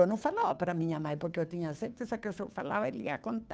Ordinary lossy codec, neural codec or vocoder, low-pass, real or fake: none; codec, 16 kHz, 2 kbps, FunCodec, trained on Chinese and English, 25 frames a second; none; fake